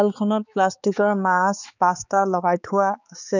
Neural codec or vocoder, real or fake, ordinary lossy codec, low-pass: codec, 16 kHz, 4 kbps, X-Codec, HuBERT features, trained on balanced general audio; fake; none; 7.2 kHz